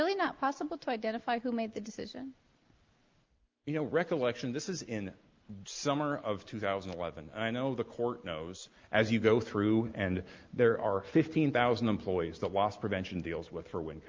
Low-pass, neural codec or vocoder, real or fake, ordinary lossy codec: 7.2 kHz; none; real; Opus, 24 kbps